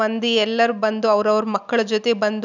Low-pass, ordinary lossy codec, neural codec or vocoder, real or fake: 7.2 kHz; none; none; real